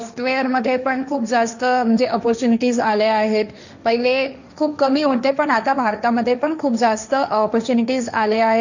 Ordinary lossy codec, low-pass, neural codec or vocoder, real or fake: none; 7.2 kHz; codec, 16 kHz, 1.1 kbps, Voila-Tokenizer; fake